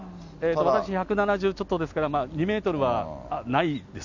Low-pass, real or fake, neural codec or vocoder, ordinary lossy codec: 7.2 kHz; real; none; none